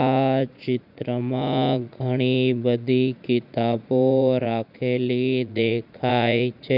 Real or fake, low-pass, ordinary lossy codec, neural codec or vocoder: fake; 5.4 kHz; none; vocoder, 44.1 kHz, 80 mel bands, Vocos